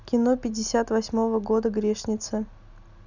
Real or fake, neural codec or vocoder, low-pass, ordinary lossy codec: real; none; 7.2 kHz; none